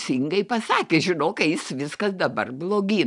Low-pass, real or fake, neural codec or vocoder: 10.8 kHz; real; none